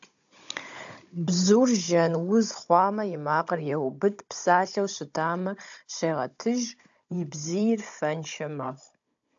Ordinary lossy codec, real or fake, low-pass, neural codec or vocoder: AAC, 64 kbps; fake; 7.2 kHz; codec, 16 kHz, 16 kbps, FunCodec, trained on Chinese and English, 50 frames a second